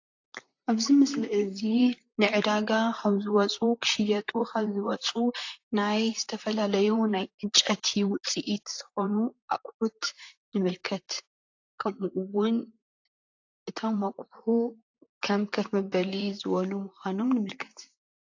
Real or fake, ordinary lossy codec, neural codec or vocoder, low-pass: fake; AAC, 48 kbps; vocoder, 44.1 kHz, 128 mel bands, Pupu-Vocoder; 7.2 kHz